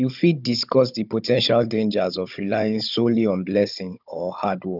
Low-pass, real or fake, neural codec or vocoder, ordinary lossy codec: 5.4 kHz; fake; codec, 16 kHz, 16 kbps, FunCodec, trained on Chinese and English, 50 frames a second; none